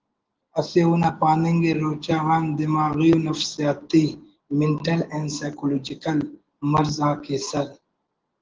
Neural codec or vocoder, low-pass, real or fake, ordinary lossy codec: none; 7.2 kHz; real; Opus, 16 kbps